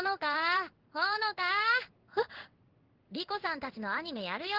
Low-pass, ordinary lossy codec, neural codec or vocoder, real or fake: 5.4 kHz; Opus, 16 kbps; codec, 16 kHz in and 24 kHz out, 1 kbps, XY-Tokenizer; fake